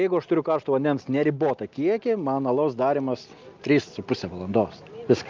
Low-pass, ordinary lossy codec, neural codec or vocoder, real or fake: 7.2 kHz; Opus, 24 kbps; none; real